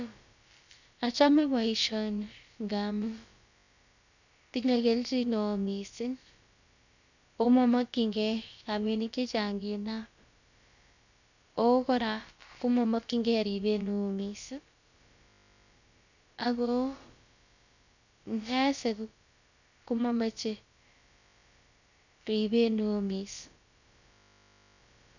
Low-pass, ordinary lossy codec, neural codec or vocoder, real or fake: 7.2 kHz; none; codec, 16 kHz, about 1 kbps, DyCAST, with the encoder's durations; fake